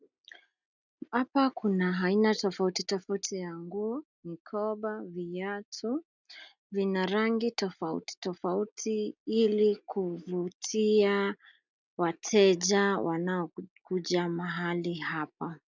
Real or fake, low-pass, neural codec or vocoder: real; 7.2 kHz; none